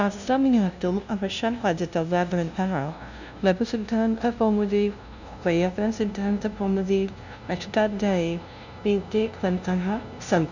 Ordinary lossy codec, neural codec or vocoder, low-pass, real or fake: none; codec, 16 kHz, 0.5 kbps, FunCodec, trained on LibriTTS, 25 frames a second; 7.2 kHz; fake